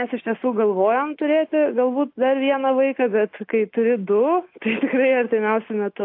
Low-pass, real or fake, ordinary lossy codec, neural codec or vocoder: 5.4 kHz; real; AAC, 32 kbps; none